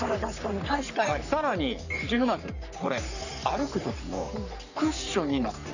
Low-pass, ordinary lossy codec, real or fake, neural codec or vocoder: 7.2 kHz; none; fake; codec, 44.1 kHz, 3.4 kbps, Pupu-Codec